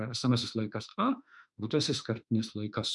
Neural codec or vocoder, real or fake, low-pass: autoencoder, 48 kHz, 32 numbers a frame, DAC-VAE, trained on Japanese speech; fake; 10.8 kHz